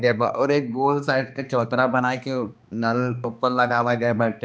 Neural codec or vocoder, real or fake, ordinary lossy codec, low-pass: codec, 16 kHz, 2 kbps, X-Codec, HuBERT features, trained on balanced general audio; fake; none; none